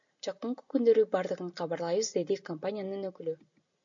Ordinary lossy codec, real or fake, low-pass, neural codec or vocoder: AAC, 64 kbps; real; 7.2 kHz; none